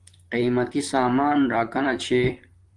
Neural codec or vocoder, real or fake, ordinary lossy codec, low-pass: vocoder, 44.1 kHz, 128 mel bands, Pupu-Vocoder; fake; Opus, 24 kbps; 10.8 kHz